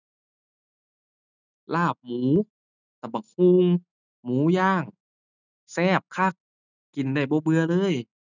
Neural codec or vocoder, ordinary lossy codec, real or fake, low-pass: none; none; real; 7.2 kHz